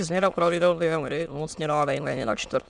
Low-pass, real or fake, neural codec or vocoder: 9.9 kHz; fake; autoencoder, 22.05 kHz, a latent of 192 numbers a frame, VITS, trained on many speakers